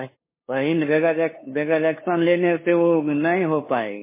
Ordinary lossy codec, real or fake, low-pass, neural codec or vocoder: MP3, 16 kbps; fake; 3.6 kHz; codec, 16 kHz, 2 kbps, FunCodec, trained on LibriTTS, 25 frames a second